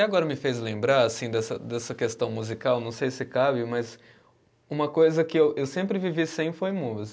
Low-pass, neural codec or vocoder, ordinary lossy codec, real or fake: none; none; none; real